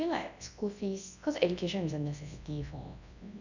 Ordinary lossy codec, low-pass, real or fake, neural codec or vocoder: none; 7.2 kHz; fake; codec, 24 kHz, 0.9 kbps, WavTokenizer, large speech release